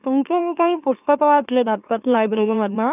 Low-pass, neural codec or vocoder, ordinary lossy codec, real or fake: 3.6 kHz; autoencoder, 44.1 kHz, a latent of 192 numbers a frame, MeloTTS; none; fake